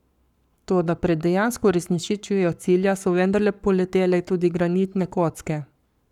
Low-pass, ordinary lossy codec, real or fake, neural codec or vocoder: 19.8 kHz; none; fake; codec, 44.1 kHz, 7.8 kbps, Pupu-Codec